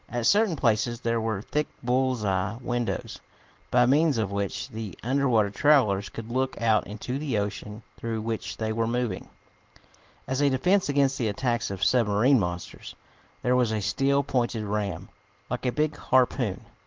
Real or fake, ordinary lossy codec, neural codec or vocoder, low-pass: real; Opus, 16 kbps; none; 7.2 kHz